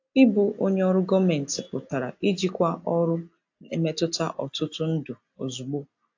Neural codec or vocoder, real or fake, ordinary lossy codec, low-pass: none; real; AAC, 48 kbps; 7.2 kHz